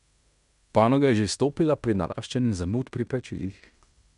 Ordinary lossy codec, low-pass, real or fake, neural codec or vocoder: none; 10.8 kHz; fake; codec, 16 kHz in and 24 kHz out, 0.9 kbps, LongCat-Audio-Codec, fine tuned four codebook decoder